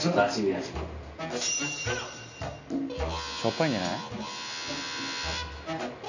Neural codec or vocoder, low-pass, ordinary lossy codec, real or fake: none; 7.2 kHz; none; real